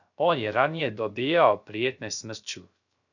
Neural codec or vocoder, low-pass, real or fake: codec, 16 kHz, 0.3 kbps, FocalCodec; 7.2 kHz; fake